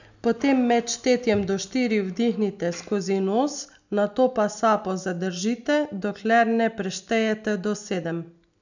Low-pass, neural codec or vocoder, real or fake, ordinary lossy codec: 7.2 kHz; none; real; none